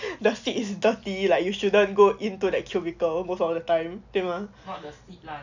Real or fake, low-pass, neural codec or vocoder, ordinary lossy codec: real; 7.2 kHz; none; none